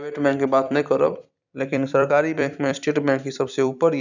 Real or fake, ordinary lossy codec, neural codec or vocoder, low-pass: fake; none; vocoder, 44.1 kHz, 128 mel bands every 256 samples, BigVGAN v2; 7.2 kHz